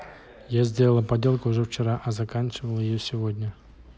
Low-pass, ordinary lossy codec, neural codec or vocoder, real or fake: none; none; none; real